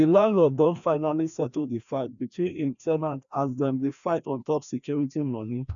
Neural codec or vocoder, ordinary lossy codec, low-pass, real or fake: codec, 16 kHz, 1 kbps, FreqCodec, larger model; none; 7.2 kHz; fake